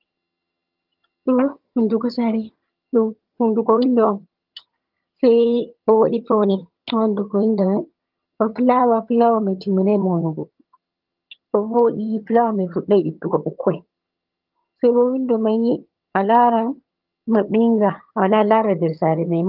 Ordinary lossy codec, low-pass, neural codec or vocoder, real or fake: Opus, 32 kbps; 5.4 kHz; vocoder, 22.05 kHz, 80 mel bands, HiFi-GAN; fake